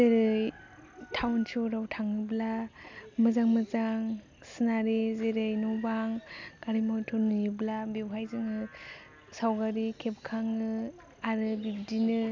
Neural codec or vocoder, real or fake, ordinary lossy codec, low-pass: none; real; MP3, 64 kbps; 7.2 kHz